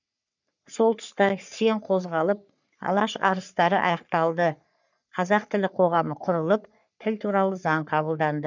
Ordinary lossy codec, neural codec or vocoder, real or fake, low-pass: none; codec, 44.1 kHz, 3.4 kbps, Pupu-Codec; fake; 7.2 kHz